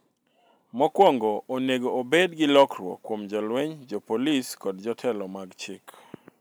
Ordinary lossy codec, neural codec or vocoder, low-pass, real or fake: none; none; none; real